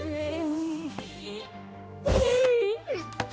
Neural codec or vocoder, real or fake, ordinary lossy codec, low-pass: codec, 16 kHz, 1 kbps, X-Codec, HuBERT features, trained on general audio; fake; none; none